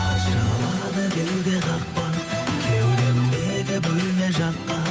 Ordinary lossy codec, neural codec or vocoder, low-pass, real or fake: none; codec, 16 kHz, 8 kbps, FunCodec, trained on Chinese and English, 25 frames a second; none; fake